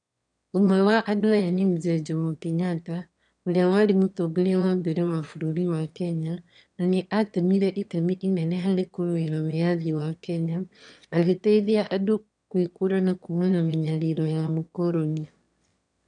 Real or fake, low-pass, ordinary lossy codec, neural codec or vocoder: fake; 9.9 kHz; none; autoencoder, 22.05 kHz, a latent of 192 numbers a frame, VITS, trained on one speaker